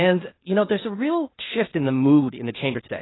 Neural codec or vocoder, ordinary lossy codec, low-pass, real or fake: codec, 16 kHz, 0.8 kbps, ZipCodec; AAC, 16 kbps; 7.2 kHz; fake